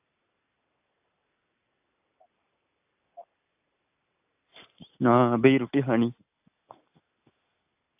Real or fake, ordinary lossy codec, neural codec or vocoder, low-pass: real; AAC, 32 kbps; none; 3.6 kHz